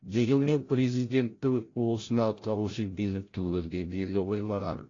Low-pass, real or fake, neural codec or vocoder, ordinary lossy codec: 7.2 kHz; fake; codec, 16 kHz, 0.5 kbps, FreqCodec, larger model; AAC, 32 kbps